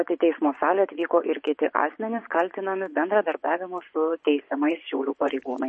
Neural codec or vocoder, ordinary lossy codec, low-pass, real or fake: vocoder, 24 kHz, 100 mel bands, Vocos; MP3, 32 kbps; 10.8 kHz; fake